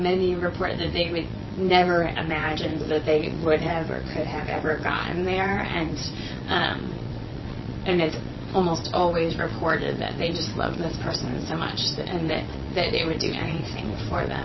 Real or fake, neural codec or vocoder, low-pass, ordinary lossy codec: fake; vocoder, 22.05 kHz, 80 mel bands, WaveNeXt; 7.2 kHz; MP3, 24 kbps